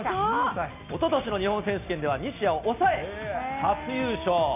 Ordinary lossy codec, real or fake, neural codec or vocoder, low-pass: none; real; none; 3.6 kHz